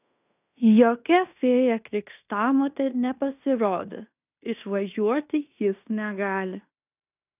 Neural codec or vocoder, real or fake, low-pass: codec, 16 kHz in and 24 kHz out, 0.9 kbps, LongCat-Audio-Codec, fine tuned four codebook decoder; fake; 3.6 kHz